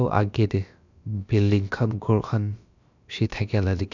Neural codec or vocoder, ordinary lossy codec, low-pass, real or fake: codec, 16 kHz, about 1 kbps, DyCAST, with the encoder's durations; none; 7.2 kHz; fake